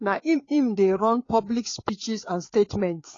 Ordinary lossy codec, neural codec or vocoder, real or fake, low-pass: AAC, 32 kbps; codec, 16 kHz, 4 kbps, FreqCodec, larger model; fake; 7.2 kHz